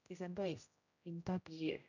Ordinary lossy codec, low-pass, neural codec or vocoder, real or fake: none; 7.2 kHz; codec, 16 kHz, 0.5 kbps, X-Codec, HuBERT features, trained on general audio; fake